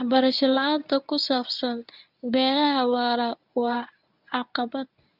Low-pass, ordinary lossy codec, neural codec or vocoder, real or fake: 5.4 kHz; none; codec, 24 kHz, 0.9 kbps, WavTokenizer, medium speech release version 1; fake